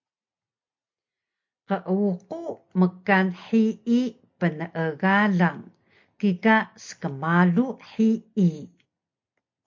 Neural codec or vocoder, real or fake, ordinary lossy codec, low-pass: none; real; MP3, 48 kbps; 7.2 kHz